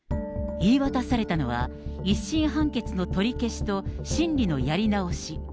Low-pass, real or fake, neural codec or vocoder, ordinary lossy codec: none; real; none; none